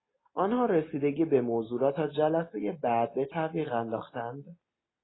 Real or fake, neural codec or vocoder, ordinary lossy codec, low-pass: real; none; AAC, 16 kbps; 7.2 kHz